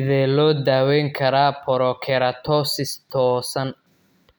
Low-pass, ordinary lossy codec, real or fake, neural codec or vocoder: none; none; real; none